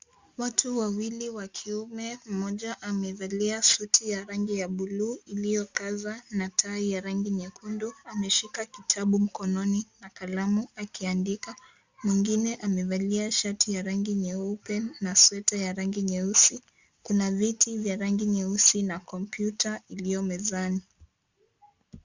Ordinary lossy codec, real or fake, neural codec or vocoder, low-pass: Opus, 64 kbps; real; none; 7.2 kHz